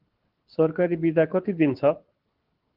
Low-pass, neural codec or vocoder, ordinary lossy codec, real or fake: 5.4 kHz; codec, 24 kHz, 6 kbps, HILCodec; Opus, 16 kbps; fake